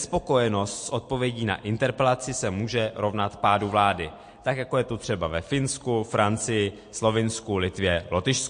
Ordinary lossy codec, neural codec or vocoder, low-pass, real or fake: MP3, 48 kbps; none; 9.9 kHz; real